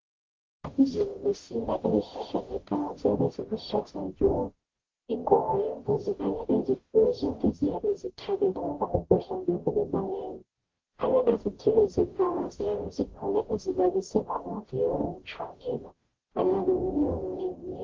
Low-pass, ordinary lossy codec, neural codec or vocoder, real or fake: 7.2 kHz; Opus, 16 kbps; codec, 44.1 kHz, 0.9 kbps, DAC; fake